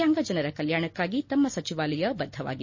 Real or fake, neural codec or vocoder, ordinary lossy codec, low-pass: real; none; MP3, 32 kbps; 7.2 kHz